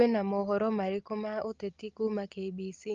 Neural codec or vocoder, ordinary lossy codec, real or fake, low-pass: none; Opus, 24 kbps; real; 7.2 kHz